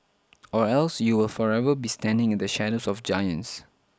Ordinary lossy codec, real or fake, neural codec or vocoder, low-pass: none; real; none; none